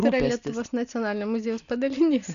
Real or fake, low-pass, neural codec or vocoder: real; 7.2 kHz; none